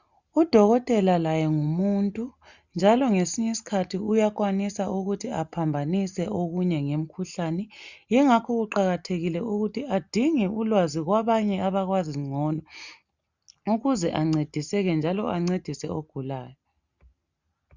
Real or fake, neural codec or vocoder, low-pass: real; none; 7.2 kHz